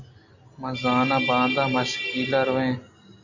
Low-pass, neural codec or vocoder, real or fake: 7.2 kHz; none; real